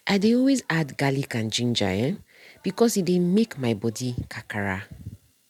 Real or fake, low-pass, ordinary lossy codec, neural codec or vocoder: real; 19.8 kHz; MP3, 96 kbps; none